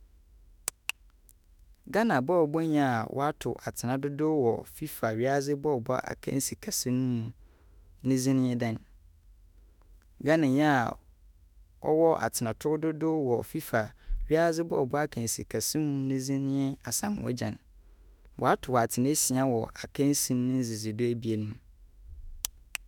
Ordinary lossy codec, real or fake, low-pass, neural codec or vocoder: none; fake; 19.8 kHz; autoencoder, 48 kHz, 32 numbers a frame, DAC-VAE, trained on Japanese speech